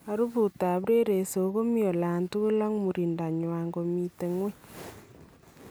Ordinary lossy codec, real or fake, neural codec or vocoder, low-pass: none; real; none; none